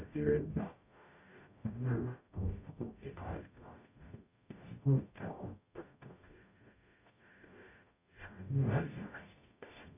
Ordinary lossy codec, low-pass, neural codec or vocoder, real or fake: AAC, 32 kbps; 3.6 kHz; codec, 44.1 kHz, 0.9 kbps, DAC; fake